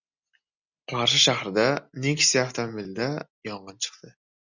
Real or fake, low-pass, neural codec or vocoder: real; 7.2 kHz; none